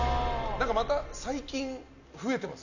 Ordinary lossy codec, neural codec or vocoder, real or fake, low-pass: none; none; real; 7.2 kHz